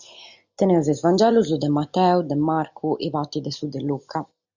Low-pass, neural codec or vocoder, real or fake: 7.2 kHz; none; real